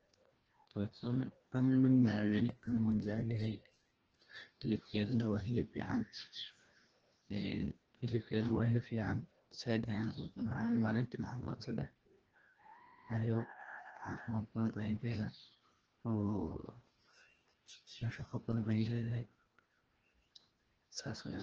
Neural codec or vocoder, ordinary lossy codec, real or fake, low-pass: codec, 16 kHz, 1 kbps, FreqCodec, larger model; Opus, 16 kbps; fake; 7.2 kHz